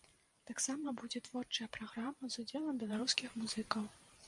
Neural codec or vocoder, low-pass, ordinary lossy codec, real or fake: vocoder, 44.1 kHz, 128 mel bands every 512 samples, BigVGAN v2; 10.8 kHz; MP3, 96 kbps; fake